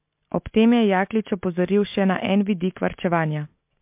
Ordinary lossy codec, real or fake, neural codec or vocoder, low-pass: MP3, 32 kbps; real; none; 3.6 kHz